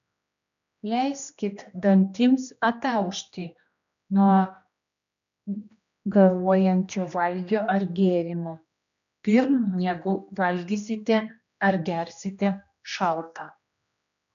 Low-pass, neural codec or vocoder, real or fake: 7.2 kHz; codec, 16 kHz, 1 kbps, X-Codec, HuBERT features, trained on general audio; fake